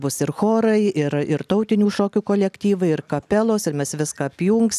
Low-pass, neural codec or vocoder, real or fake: 14.4 kHz; none; real